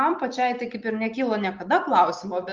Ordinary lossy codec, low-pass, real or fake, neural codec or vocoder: Opus, 32 kbps; 7.2 kHz; real; none